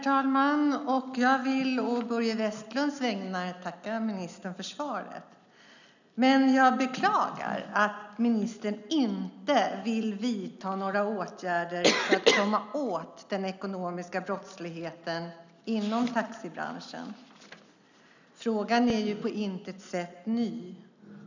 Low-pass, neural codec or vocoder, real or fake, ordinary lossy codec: 7.2 kHz; none; real; none